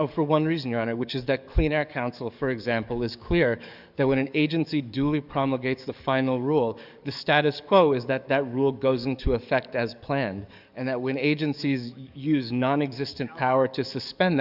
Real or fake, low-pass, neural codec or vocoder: fake; 5.4 kHz; codec, 44.1 kHz, 7.8 kbps, DAC